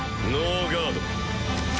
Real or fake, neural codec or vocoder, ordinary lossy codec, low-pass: real; none; none; none